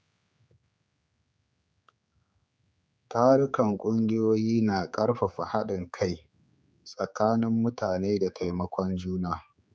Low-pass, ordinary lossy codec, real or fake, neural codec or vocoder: none; none; fake; codec, 16 kHz, 4 kbps, X-Codec, HuBERT features, trained on general audio